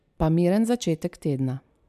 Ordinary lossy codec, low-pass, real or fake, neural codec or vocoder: MP3, 96 kbps; 14.4 kHz; real; none